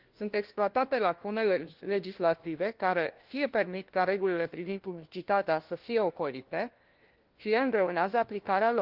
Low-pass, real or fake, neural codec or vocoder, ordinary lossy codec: 5.4 kHz; fake; codec, 16 kHz, 1 kbps, FunCodec, trained on LibriTTS, 50 frames a second; Opus, 16 kbps